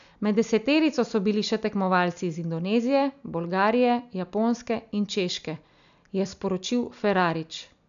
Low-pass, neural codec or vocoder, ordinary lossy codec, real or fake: 7.2 kHz; none; none; real